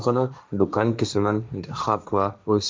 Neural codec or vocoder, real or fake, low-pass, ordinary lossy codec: codec, 16 kHz, 1.1 kbps, Voila-Tokenizer; fake; none; none